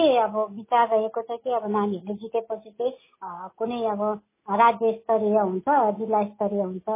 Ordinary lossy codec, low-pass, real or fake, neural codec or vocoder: MP3, 16 kbps; 3.6 kHz; real; none